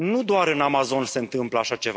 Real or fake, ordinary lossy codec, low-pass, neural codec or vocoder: real; none; none; none